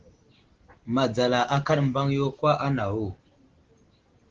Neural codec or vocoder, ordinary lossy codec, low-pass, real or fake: none; Opus, 16 kbps; 7.2 kHz; real